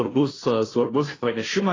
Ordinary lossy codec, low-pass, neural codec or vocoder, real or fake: AAC, 32 kbps; 7.2 kHz; codec, 16 kHz, 0.5 kbps, FunCodec, trained on Chinese and English, 25 frames a second; fake